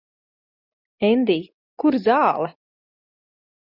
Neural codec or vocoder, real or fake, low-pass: none; real; 5.4 kHz